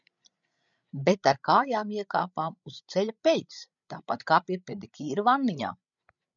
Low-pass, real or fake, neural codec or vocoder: 7.2 kHz; fake; codec, 16 kHz, 16 kbps, FreqCodec, larger model